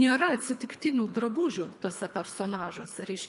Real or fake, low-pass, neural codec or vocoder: fake; 10.8 kHz; codec, 24 kHz, 3 kbps, HILCodec